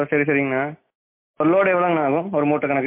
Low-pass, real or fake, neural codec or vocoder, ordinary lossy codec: 3.6 kHz; real; none; MP3, 24 kbps